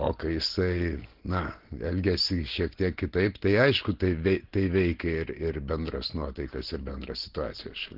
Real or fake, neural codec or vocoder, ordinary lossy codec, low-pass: real; none; Opus, 16 kbps; 5.4 kHz